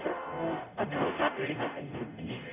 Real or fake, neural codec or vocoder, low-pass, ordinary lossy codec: fake; codec, 44.1 kHz, 0.9 kbps, DAC; 3.6 kHz; none